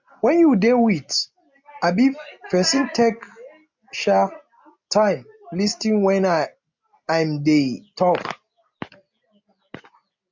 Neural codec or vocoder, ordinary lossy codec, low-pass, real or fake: none; MP3, 48 kbps; 7.2 kHz; real